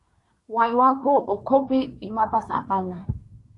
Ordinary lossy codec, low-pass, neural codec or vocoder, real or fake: AAC, 48 kbps; 10.8 kHz; codec, 24 kHz, 1 kbps, SNAC; fake